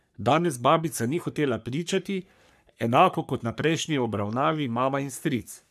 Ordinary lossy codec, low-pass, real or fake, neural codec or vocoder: none; 14.4 kHz; fake; codec, 44.1 kHz, 3.4 kbps, Pupu-Codec